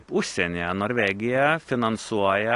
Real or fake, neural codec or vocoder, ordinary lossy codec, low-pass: real; none; AAC, 48 kbps; 10.8 kHz